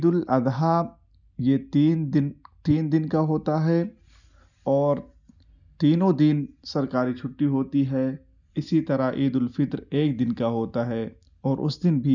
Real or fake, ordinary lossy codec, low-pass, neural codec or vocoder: real; none; 7.2 kHz; none